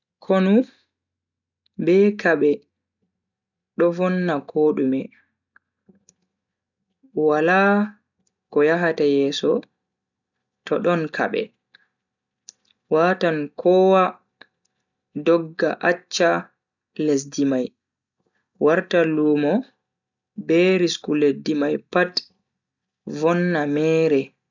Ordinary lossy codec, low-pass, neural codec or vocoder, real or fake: none; 7.2 kHz; none; real